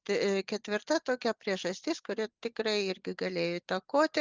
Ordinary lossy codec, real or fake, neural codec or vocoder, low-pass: Opus, 32 kbps; real; none; 7.2 kHz